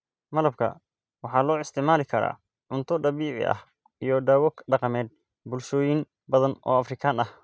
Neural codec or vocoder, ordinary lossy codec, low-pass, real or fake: none; none; none; real